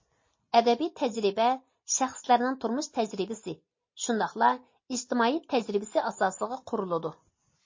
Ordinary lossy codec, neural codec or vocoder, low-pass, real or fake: MP3, 32 kbps; none; 7.2 kHz; real